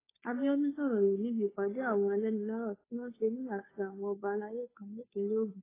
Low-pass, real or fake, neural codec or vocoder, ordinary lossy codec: 3.6 kHz; fake; codec, 16 kHz, 2 kbps, FunCodec, trained on Chinese and English, 25 frames a second; AAC, 16 kbps